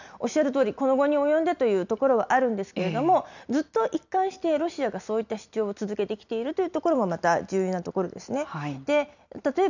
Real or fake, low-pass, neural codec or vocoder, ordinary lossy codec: real; 7.2 kHz; none; none